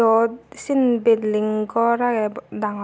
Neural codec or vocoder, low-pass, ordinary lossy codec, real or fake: none; none; none; real